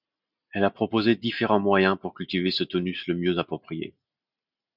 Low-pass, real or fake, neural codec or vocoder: 5.4 kHz; real; none